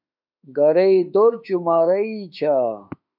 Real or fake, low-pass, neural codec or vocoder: fake; 5.4 kHz; autoencoder, 48 kHz, 32 numbers a frame, DAC-VAE, trained on Japanese speech